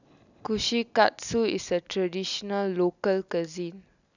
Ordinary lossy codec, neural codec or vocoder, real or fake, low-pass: none; none; real; 7.2 kHz